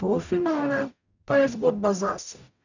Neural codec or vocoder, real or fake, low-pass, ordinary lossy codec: codec, 44.1 kHz, 0.9 kbps, DAC; fake; 7.2 kHz; none